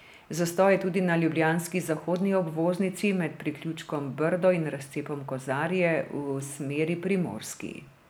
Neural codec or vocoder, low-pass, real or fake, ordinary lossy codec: none; none; real; none